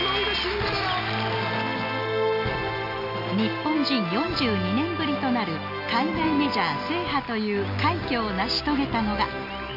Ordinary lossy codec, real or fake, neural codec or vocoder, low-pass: none; real; none; 5.4 kHz